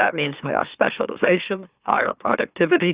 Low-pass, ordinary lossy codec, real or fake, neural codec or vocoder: 3.6 kHz; Opus, 64 kbps; fake; autoencoder, 44.1 kHz, a latent of 192 numbers a frame, MeloTTS